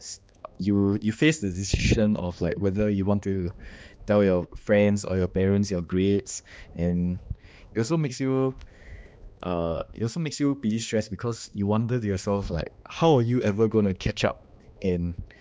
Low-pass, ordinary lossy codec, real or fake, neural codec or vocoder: none; none; fake; codec, 16 kHz, 2 kbps, X-Codec, HuBERT features, trained on balanced general audio